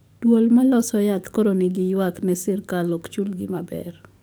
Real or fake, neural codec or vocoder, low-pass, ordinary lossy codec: fake; codec, 44.1 kHz, 7.8 kbps, DAC; none; none